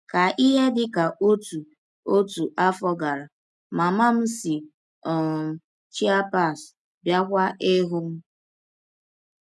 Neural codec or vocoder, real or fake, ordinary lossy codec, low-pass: none; real; none; none